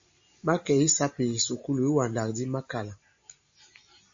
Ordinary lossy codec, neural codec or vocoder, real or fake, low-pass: AAC, 64 kbps; none; real; 7.2 kHz